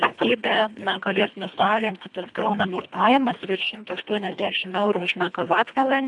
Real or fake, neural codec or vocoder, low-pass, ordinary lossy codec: fake; codec, 24 kHz, 1.5 kbps, HILCodec; 10.8 kHz; MP3, 96 kbps